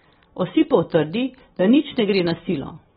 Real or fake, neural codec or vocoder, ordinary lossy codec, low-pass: real; none; AAC, 16 kbps; 14.4 kHz